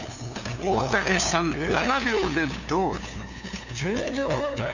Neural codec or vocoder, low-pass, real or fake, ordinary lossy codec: codec, 16 kHz, 2 kbps, FunCodec, trained on LibriTTS, 25 frames a second; 7.2 kHz; fake; none